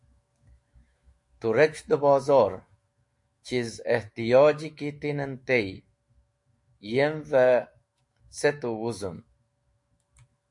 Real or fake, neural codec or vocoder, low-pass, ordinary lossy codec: fake; autoencoder, 48 kHz, 128 numbers a frame, DAC-VAE, trained on Japanese speech; 10.8 kHz; MP3, 48 kbps